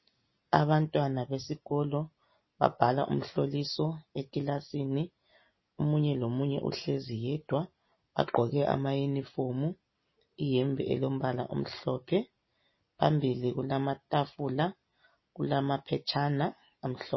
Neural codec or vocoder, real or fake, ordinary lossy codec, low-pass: none; real; MP3, 24 kbps; 7.2 kHz